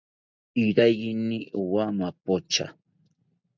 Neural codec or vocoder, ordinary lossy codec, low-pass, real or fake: none; MP3, 64 kbps; 7.2 kHz; real